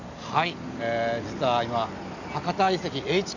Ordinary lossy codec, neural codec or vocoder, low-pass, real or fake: none; none; 7.2 kHz; real